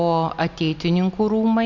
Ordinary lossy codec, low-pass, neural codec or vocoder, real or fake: Opus, 64 kbps; 7.2 kHz; none; real